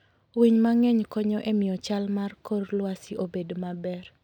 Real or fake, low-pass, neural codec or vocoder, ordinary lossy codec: real; 19.8 kHz; none; none